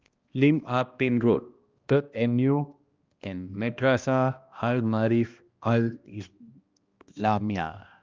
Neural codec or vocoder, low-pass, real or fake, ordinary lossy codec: codec, 16 kHz, 1 kbps, X-Codec, HuBERT features, trained on balanced general audio; 7.2 kHz; fake; Opus, 24 kbps